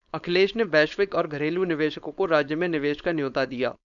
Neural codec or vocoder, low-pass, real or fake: codec, 16 kHz, 4.8 kbps, FACodec; 7.2 kHz; fake